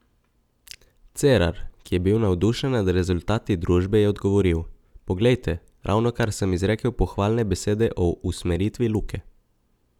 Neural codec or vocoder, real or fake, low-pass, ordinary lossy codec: none; real; 19.8 kHz; none